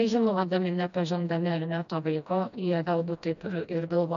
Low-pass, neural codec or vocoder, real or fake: 7.2 kHz; codec, 16 kHz, 1 kbps, FreqCodec, smaller model; fake